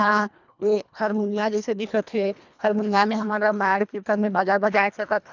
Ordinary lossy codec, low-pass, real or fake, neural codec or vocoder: none; 7.2 kHz; fake; codec, 24 kHz, 1.5 kbps, HILCodec